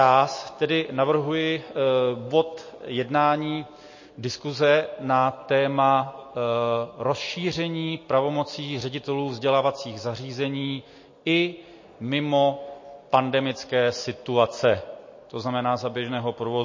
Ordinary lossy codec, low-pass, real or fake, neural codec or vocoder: MP3, 32 kbps; 7.2 kHz; real; none